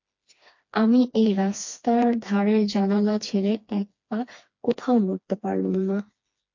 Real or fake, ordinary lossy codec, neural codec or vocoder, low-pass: fake; MP3, 48 kbps; codec, 16 kHz, 2 kbps, FreqCodec, smaller model; 7.2 kHz